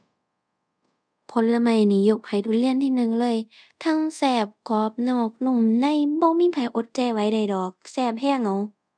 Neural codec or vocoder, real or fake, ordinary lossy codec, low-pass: codec, 24 kHz, 0.5 kbps, DualCodec; fake; none; 9.9 kHz